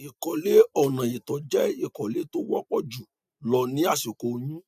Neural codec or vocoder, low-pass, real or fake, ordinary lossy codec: none; 14.4 kHz; real; none